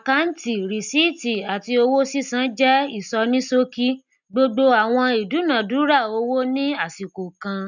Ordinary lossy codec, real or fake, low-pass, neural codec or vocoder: none; real; 7.2 kHz; none